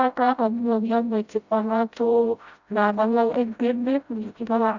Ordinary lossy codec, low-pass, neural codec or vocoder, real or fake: Opus, 64 kbps; 7.2 kHz; codec, 16 kHz, 0.5 kbps, FreqCodec, smaller model; fake